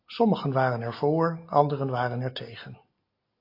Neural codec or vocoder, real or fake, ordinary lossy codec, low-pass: none; real; AAC, 32 kbps; 5.4 kHz